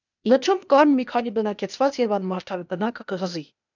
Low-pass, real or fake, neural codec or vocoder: 7.2 kHz; fake; codec, 16 kHz, 0.8 kbps, ZipCodec